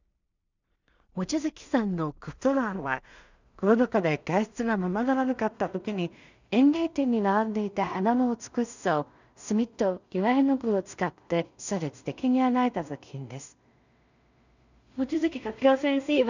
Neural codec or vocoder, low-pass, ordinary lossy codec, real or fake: codec, 16 kHz in and 24 kHz out, 0.4 kbps, LongCat-Audio-Codec, two codebook decoder; 7.2 kHz; none; fake